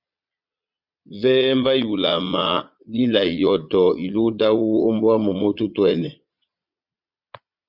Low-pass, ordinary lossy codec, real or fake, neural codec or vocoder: 5.4 kHz; Opus, 64 kbps; fake; vocoder, 22.05 kHz, 80 mel bands, Vocos